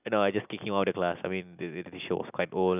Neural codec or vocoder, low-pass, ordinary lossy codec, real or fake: none; 3.6 kHz; none; real